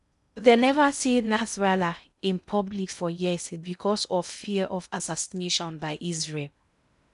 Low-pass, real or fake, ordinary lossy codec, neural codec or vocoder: 10.8 kHz; fake; none; codec, 16 kHz in and 24 kHz out, 0.6 kbps, FocalCodec, streaming, 2048 codes